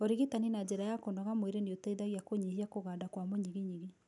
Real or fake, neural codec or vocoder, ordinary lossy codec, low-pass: real; none; none; 10.8 kHz